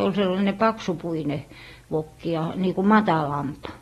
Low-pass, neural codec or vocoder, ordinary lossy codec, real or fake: 19.8 kHz; none; AAC, 32 kbps; real